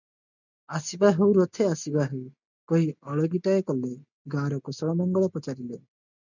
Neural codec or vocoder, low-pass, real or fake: none; 7.2 kHz; real